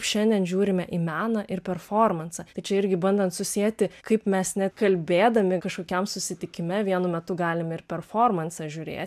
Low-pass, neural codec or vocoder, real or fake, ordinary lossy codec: 14.4 kHz; none; real; MP3, 96 kbps